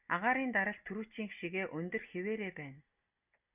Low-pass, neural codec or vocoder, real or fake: 3.6 kHz; none; real